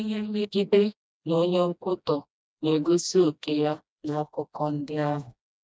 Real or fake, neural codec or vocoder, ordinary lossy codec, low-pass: fake; codec, 16 kHz, 1 kbps, FreqCodec, smaller model; none; none